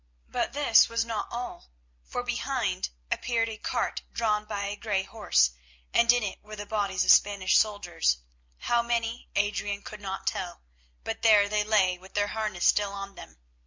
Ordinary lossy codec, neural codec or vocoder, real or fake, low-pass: MP3, 64 kbps; none; real; 7.2 kHz